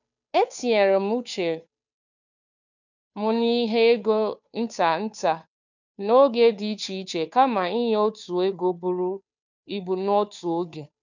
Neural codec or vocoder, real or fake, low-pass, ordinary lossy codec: codec, 16 kHz, 2 kbps, FunCodec, trained on Chinese and English, 25 frames a second; fake; 7.2 kHz; none